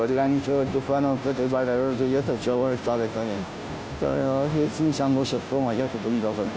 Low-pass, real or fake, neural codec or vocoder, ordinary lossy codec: none; fake; codec, 16 kHz, 0.5 kbps, FunCodec, trained on Chinese and English, 25 frames a second; none